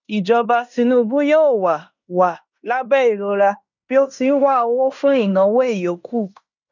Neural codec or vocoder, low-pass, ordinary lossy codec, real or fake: codec, 16 kHz in and 24 kHz out, 0.9 kbps, LongCat-Audio-Codec, fine tuned four codebook decoder; 7.2 kHz; none; fake